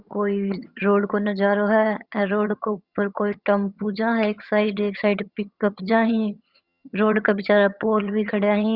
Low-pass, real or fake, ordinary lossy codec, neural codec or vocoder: 5.4 kHz; fake; Opus, 64 kbps; vocoder, 22.05 kHz, 80 mel bands, HiFi-GAN